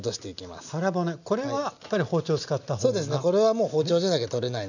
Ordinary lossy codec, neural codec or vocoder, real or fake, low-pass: none; none; real; 7.2 kHz